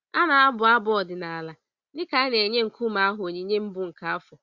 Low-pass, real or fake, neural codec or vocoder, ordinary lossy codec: 7.2 kHz; real; none; Opus, 64 kbps